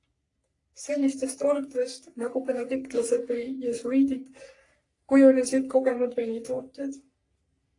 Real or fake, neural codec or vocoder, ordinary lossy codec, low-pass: fake; codec, 44.1 kHz, 3.4 kbps, Pupu-Codec; AAC, 48 kbps; 10.8 kHz